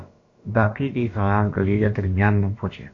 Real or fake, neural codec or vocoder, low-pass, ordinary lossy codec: fake; codec, 16 kHz, about 1 kbps, DyCAST, with the encoder's durations; 7.2 kHz; AAC, 48 kbps